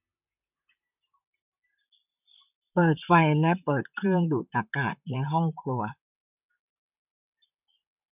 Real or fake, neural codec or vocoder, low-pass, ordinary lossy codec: fake; vocoder, 44.1 kHz, 128 mel bands, Pupu-Vocoder; 3.6 kHz; none